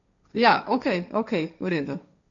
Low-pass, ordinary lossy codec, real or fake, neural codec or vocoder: 7.2 kHz; none; fake; codec, 16 kHz, 1.1 kbps, Voila-Tokenizer